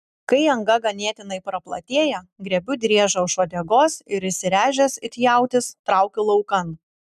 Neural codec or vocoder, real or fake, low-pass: none; real; 14.4 kHz